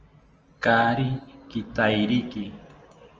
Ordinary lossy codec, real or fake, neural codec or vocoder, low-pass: Opus, 24 kbps; real; none; 7.2 kHz